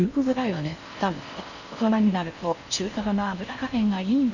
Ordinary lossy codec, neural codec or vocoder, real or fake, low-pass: none; codec, 16 kHz in and 24 kHz out, 0.6 kbps, FocalCodec, streaming, 4096 codes; fake; 7.2 kHz